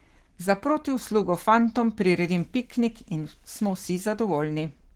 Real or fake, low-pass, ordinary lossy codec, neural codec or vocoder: fake; 19.8 kHz; Opus, 16 kbps; codec, 44.1 kHz, 7.8 kbps, Pupu-Codec